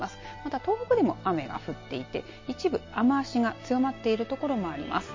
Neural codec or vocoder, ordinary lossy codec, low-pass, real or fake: none; MP3, 32 kbps; 7.2 kHz; real